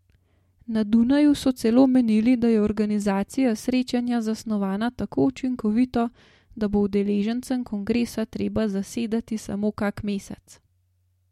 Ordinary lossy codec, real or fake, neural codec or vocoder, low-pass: MP3, 64 kbps; real; none; 19.8 kHz